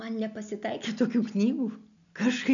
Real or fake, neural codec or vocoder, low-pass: real; none; 7.2 kHz